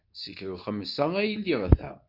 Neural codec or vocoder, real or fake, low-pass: codec, 24 kHz, 0.9 kbps, WavTokenizer, medium speech release version 2; fake; 5.4 kHz